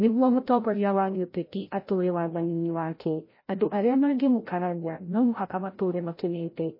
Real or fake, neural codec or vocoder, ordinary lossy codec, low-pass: fake; codec, 16 kHz, 0.5 kbps, FreqCodec, larger model; MP3, 24 kbps; 5.4 kHz